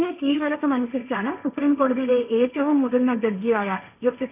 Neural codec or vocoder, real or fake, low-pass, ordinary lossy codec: codec, 16 kHz, 1.1 kbps, Voila-Tokenizer; fake; 3.6 kHz; none